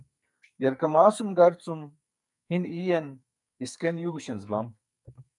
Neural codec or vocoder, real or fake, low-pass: codec, 44.1 kHz, 2.6 kbps, SNAC; fake; 10.8 kHz